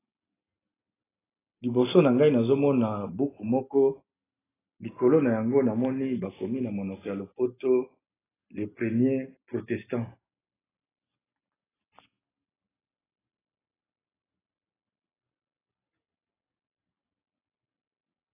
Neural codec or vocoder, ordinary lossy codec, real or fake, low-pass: none; AAC, 16 kbps; real; 3.6 kHz